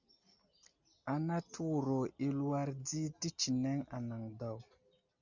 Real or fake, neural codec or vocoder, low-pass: real; none; 7.2 kHz